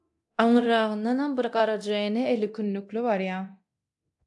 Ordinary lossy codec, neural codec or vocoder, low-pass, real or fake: AAC, 64 kbps; codec, 24 kHz, 0.9 kbps, DualCodec; 10.8 kHz; fake